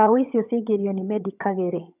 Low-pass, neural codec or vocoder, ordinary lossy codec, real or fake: 3.6 kHz; vocoder, 22.05 kHz, 80 mel bands, HiFi-GAN; none; fake